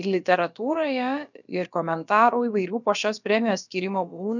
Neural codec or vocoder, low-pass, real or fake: codec, 16 kHz, about 1 kbps, DyCAST, with the encoder's durations; 7.2 kHz; fake